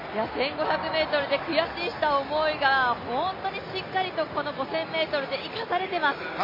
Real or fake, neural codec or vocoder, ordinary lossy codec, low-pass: real; none; MP3, 24 kbps; 5.4 kHz